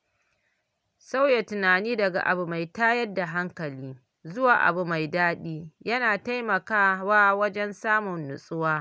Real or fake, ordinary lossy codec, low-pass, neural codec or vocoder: real; none; none; none